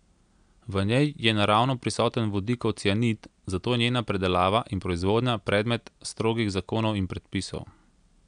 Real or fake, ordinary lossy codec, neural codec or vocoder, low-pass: real; none; none; 9.9 kHz